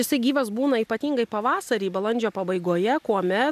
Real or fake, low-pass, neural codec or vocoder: real; 14.4 kHz; none